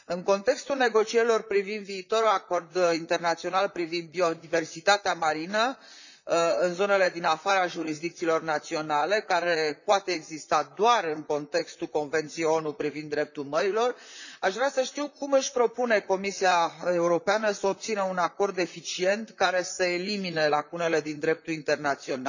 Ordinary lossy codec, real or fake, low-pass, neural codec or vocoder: none; fake; 7.2 kHz; vocoder, 44.1 kHz, 128 mel bands, Pupu-Vocoder